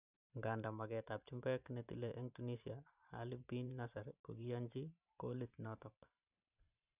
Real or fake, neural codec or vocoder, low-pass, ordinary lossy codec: fake; vocoder, 44.1 kHz, 128 mel bands every 512 samples, BigVGAN v2; 3.6 kHz; none